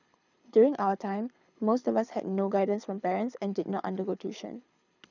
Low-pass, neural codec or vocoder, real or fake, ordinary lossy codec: 7.2 kHz; codec, 24 kHz, 6 kbps, HILCodec; fake; none